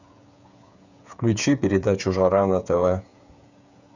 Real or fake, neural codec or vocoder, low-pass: fake; codec, 16 kHz, 8 kbps, FreqCodec, smaller model; 7.2 kHz